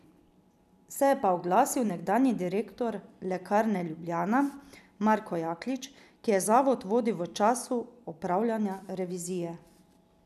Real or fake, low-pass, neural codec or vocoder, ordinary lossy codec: fake; 14.4 kHz; vocoder, 44.1 kHz, 128 mel bands every 256 samples, BigVGAN v2; none